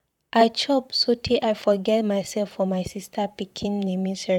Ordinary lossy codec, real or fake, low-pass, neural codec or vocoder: none; fake; 19.8 kHz; vocoder, 44.1 kHz, 128 mel bands, Pupu-Vocoder